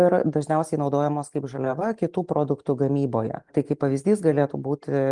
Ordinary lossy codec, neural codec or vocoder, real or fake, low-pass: Opus, 24 kbps; none; real; 10.8 kHz